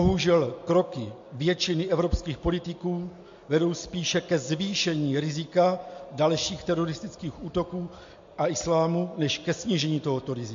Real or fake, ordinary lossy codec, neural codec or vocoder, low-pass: real; MP3, 48 kbps; none; 7.2 kHz